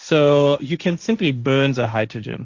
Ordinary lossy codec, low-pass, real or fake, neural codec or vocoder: Opus, 64 kbps; 7.2 kHz; fake; codec, 16 kHz, 1.1 kbps, Voila-Tokenizer